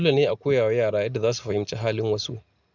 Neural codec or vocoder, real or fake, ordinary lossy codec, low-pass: none; real; none; 7.2 kHz